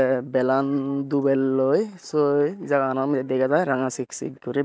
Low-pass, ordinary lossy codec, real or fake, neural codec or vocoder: none; none; real; none